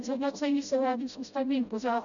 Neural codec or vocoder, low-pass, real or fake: codec, 16 kHz, 0.5 kbps, FreqCodec, smaller model; 7.2 kHz; fake